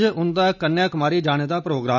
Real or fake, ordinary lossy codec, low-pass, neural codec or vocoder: real; none; 7.2 kHz; none